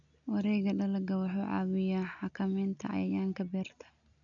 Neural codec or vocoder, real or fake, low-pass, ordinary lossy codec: none; real; 7.2 kHz; none